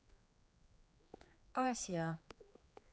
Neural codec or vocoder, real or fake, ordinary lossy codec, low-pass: codec, 16 kHz, 4 kbps, X-Codec, HuBERT features, trained on general audio; fake; none; none